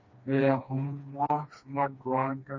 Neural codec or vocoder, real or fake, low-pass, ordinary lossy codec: codec, 16 kHz, 2 kbps, FreqCodec, smaller model; fake; 7.2 kHz; MP3, 48 kbps